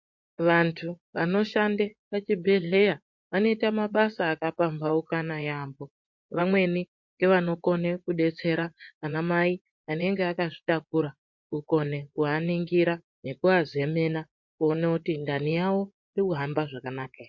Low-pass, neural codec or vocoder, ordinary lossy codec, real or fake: 7.2 kHz; vocoder, 24 kHz, 100 mel bands, Vocos; MP3, 48 kbps; fake